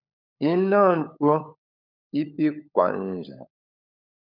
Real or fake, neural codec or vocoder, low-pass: fake; codec, 16 kHz, 16 kbps, FunCodec, trained on LibriTTS, 50 frames a second; 5.4 kHz